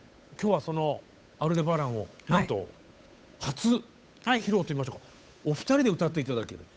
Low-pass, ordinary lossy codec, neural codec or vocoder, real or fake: none; none; codec, 16 kHz, 8 kbps, FunCodec, trained on Chinese and English, 25 frames a second; fake